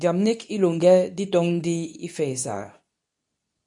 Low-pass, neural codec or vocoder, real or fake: 10.8 kHz; codec, 24 kHz, 0.9 kbps, WavTokenizer, medium speech release version 2; fake